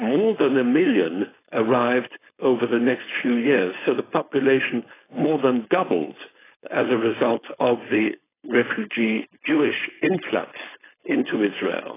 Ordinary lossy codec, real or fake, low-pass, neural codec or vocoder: AAC, 16 kbps; fake; 3.6 kHz; codec, 16 kHz, 4.8 kbps, FACodec